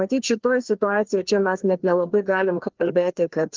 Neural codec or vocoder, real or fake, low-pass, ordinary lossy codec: codec, 16 kHz, 2 kbps, FreqCodec, larger model; fake; 7.2 kHz; Opus, 16 kbps